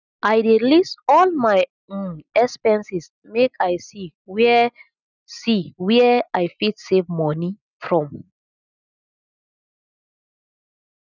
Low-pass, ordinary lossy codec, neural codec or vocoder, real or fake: 7.2 kHz; none; none; real